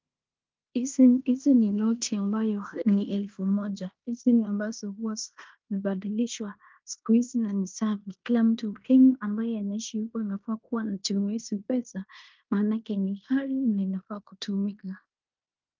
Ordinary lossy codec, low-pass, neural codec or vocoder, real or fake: Opus, 32 kbps; 7.2 kHz; codec, 16 kHz in and 24 kHz out, 0.9 kbps, LongCat-Audio-Codec, fine tuned four codebook decoder; fake